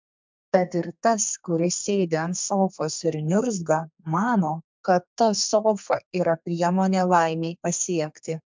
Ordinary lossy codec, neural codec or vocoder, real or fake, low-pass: MP3, 64 kbps; codec, 32 kHz, 1.9 kbps, SNAC; fake; 7.2 kHz